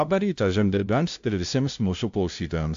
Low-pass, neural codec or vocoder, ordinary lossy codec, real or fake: 7.2 kHz; codec, 16 kHz, 0.5 kbps, FunCodec, trained on LibriTTS, 25 frames a second; AAC, 64 kbps; fake